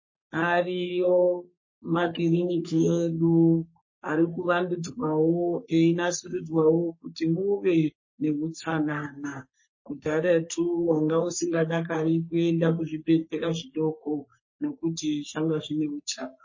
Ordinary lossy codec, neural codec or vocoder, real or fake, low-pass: MP3, 32 kbps; codec, 44.1 kHz, 3.4 kbps, Pupu-Codec; fake; 7.2 kHz